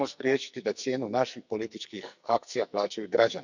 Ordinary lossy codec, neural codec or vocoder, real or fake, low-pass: none; codec, 44.1 kHz, 2.6 kbps, SNAC; fake; 7.2 kHz